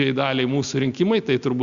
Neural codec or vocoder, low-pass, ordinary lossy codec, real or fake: none; 7.2 kHz; Opus, 64 kbps; real